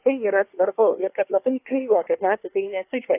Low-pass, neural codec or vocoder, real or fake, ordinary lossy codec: 3.6 kHz; codec, 24 kHz, 1 kbps, SNAC; fake; AAC, 24 kbps